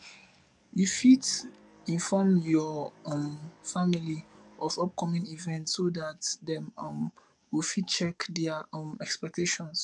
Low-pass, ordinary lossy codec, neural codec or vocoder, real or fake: 10.8 kHz; none; codec, 44.1 kHz, 7.8 kbps, DAC; fake